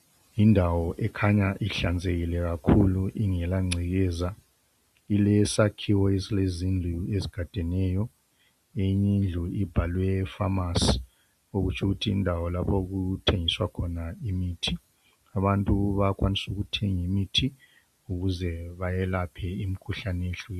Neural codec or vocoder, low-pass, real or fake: none; 14.4 kHz; real